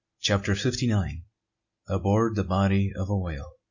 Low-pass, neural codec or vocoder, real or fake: 7.2 kHz; none; real